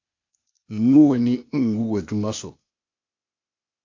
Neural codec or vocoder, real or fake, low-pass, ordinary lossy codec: codec, 16 kHz, 0.8 kbps, ZipCodec; fake; 7.2 kHz; MP3, 48 kbps